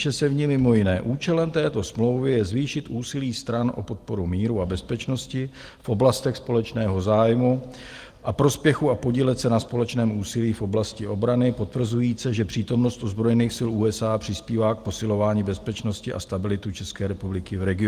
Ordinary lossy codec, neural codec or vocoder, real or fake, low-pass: Opus, 24 kbps; none; real; 14.4 kHz